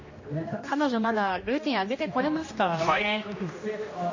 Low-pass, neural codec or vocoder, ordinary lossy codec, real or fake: 7.2 kHz; codec, 16 kHz, 1 kbps, X-Codec, HuBERT features, trained on general audio; MP3, 32 kbps; fake